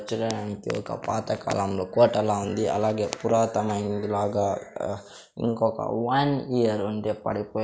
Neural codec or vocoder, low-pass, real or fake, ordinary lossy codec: none; none; real; none